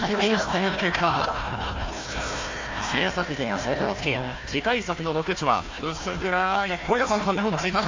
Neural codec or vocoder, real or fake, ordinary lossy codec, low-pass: codec, 16 kHz, 1 kbps, FunCodec, trained on Chinese and English, 50 frames a second; fake; MP3, 48 kbps; 7.2 kHz